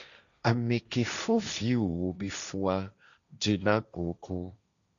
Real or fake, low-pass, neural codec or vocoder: fake; 7.2 kHz; codec, 16 kHz, 1.1 kbps, Voila-Tokenizer